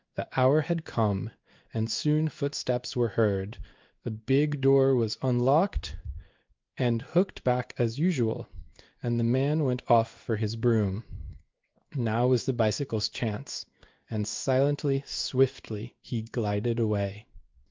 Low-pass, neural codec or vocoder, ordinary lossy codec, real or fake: 7.2 kHz; codec, 16 kHz in and 24 kHz out, 1 kbps, XY-Tokenizer; Opus, 24 kbps; fake